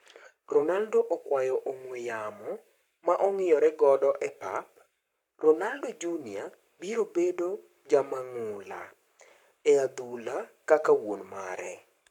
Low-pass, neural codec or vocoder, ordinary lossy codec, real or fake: 19.8 kHz; codec, 44.1 kHz, 7.8 kbps, Pupu-Codec; none; fake